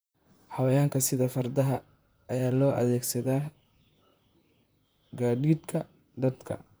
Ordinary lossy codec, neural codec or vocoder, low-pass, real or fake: none; vocoder, 44.1 kHz, 128 mel bands, Pupu-Vocoder; none; fake